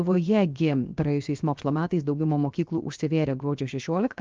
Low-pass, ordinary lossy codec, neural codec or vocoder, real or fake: 7.2 kHz; Opus, 24 kbps; codec, 16 kHz, 0.7 kbps, FocalCodec; fake